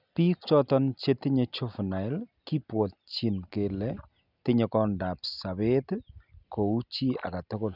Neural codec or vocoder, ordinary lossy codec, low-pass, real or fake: none; none; 5.4 kHz; real